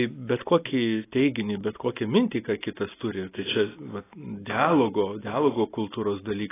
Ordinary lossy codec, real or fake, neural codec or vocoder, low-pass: AAC, 16 kbps; real; none; 3.6 kHz